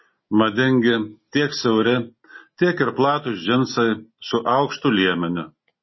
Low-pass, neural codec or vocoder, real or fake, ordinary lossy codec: 7.2 kHz; none; real; MP3, 24 kbps